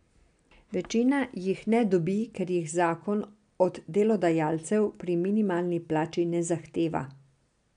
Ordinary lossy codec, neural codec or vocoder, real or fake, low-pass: MP3, 96 kbps; none; real; 9.9 kHz